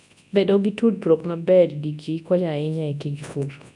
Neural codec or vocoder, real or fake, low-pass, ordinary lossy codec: codec, 24 kHz, 0.9 kbps, WavTokenizer, large speech release; fake; 10.8 kHz; none